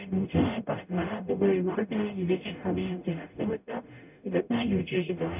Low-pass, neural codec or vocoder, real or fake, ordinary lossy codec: 3.6 kHz; codec, 44.1 kHz, 0.9 kbps, DAC; fake; none